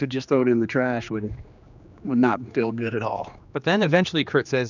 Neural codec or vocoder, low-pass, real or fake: codec, 16 kHz, 2 kbps, X-Codec, HuBERT features, trained on general audio; 7.2 kHz; fake